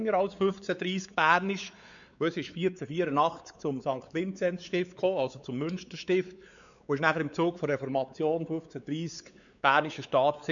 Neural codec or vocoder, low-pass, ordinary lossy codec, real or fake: codec, 16 kHz, 4 kbps, X-Codec, WavLM features, trained on Multilingual LibriSpeech; 7.2 kHz; none; fake